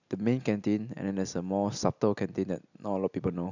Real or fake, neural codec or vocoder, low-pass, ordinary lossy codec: real; none; 7.2 kHz; none